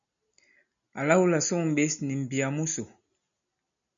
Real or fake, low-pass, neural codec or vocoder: real; 7.2 kHz; none